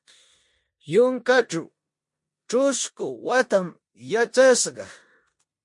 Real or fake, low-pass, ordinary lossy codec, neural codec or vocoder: fake; 10.8 kHz; MP3, 48 kbps; codec, 16 kHz in and 24 kHz out, 0.9 kbps, LongCat-Audio-Codec, four codebook decoder